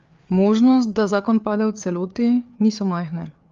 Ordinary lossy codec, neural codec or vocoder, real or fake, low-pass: Opus, 32 kbps; codec, 16 kHz, 4 kbps, FreqCodec, larger model; fake; 7.2 kHz